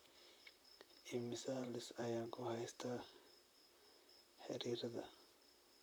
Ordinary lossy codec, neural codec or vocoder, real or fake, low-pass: none; vocoder, 44.1 kHz, 128 mel bands, Pupu-Vocoder; fake; none